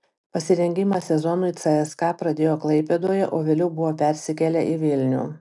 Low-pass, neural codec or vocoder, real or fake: 10.8 kHz; none; real